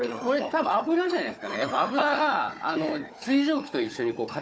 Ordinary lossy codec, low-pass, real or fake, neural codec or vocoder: none; none; fake; codec, 16 kHz, 4 kbps, FunCodec, trained on Chinese and English, 50 frames a second